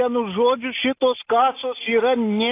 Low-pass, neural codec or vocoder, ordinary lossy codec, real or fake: 3.6 kHz; none; AAC, 24 kbps; real